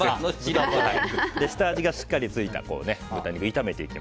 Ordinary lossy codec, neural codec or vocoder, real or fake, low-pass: none; none; real; none